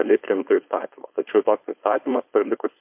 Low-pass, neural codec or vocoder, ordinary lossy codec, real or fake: 3.6 kHz; codec, 16 kHz, 4.8 kbps, FACodec; MP3, 32 kbps; fake